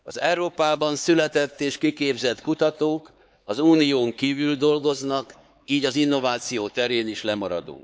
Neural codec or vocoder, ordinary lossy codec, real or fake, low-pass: codec, 16 kHz, 4 kbps, X-Codec, HuBERT features, trained on LibriSpeech; none; fake; none